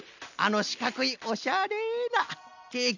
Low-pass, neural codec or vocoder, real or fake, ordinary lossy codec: 7.2 kHz; none; real; none